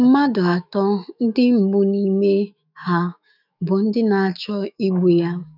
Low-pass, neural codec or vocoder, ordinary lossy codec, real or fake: 5.4 kHz; autoencoder, 48 kHz, 128 numbers a frame, DAC-VAE, trained on Japanese speech; none; fake